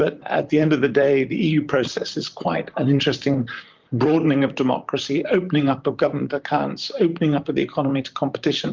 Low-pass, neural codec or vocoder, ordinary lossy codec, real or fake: 7.2 kHz; vocoder, 44.1 kHz, 128 mel bands, Pupu-Vocoder; Opus, 24 kbps; fake